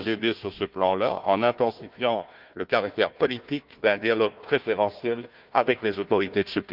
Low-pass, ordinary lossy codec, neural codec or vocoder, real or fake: 5.4 kHz; Opus, 24 kbps; codec, 16 kHz, 1 kbps, FunCodec, trained on Chinese and English, 50 frames a second; fake